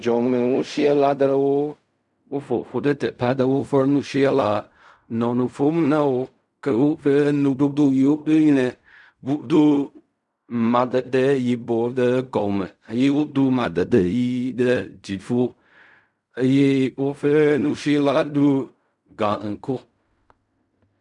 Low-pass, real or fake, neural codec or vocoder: 10.8 kHz; fake; codec, 16 kHz in and 24 kHz out, 0.4 kbps, LongCat-Audio-Codec, fine tuned four codebook decoder